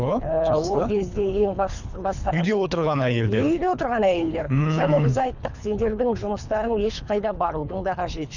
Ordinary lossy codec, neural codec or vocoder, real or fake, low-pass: none; codec, 24 kHz, 3 kbps, HILCodec; fake; 7.2 kHz